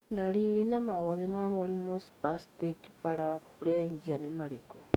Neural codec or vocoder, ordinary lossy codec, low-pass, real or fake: codec, 44.1 kHz, 2.6 kbps, DAC; none; 19.8 kHz; fake